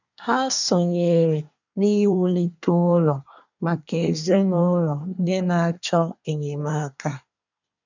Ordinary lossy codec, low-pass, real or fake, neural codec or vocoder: none; 7.2 kHz; fake; codec, 24 kHz, 1 kbps, SNAC